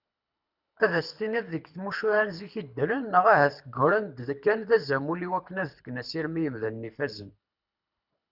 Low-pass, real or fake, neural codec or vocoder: 5.4 kHz; fake; codec, 24 kHz, 6 kbps, HILCodec